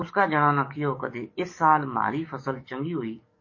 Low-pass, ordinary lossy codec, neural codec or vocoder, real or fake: 7.2 kHz; MP3, 32 kbps; codec, 44.1 kHz, 7.8 kbps, DAC; fake